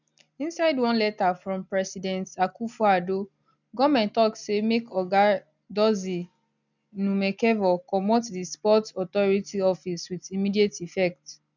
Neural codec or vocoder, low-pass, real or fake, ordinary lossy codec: none; 7.2 kHz; real; none